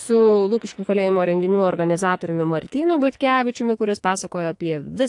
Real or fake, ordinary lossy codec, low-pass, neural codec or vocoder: fake; AAC, 64 kbps; 10.8 kHz; codec, 44.1 kHz, 2.6 kbps, SNAC